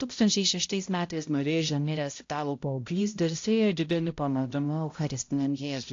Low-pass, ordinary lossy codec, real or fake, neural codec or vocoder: 7.2 kHz; AAC, 48 kbps; fake; codec, 16 kHz, 0.5 kbps, X-Codec, HuBERT features, trained on balanced general audio